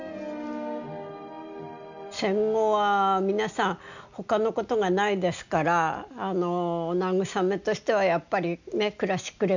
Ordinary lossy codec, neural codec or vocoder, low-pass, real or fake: none; none; 7.2 kHz; real